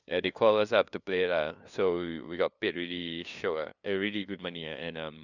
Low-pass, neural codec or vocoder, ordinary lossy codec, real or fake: 7.2 kHz; codec, 16 kHz, 2 kbps, FunCodec, trained on LibriTTS, 25 frames a second; none; fake